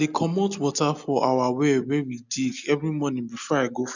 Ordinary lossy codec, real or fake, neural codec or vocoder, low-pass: none; real; none; 7.2 kHz